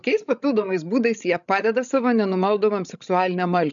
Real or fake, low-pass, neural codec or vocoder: fake; 7.2 kHz; codec, 16 kHz, 16 kbps, FreqCodec, larger model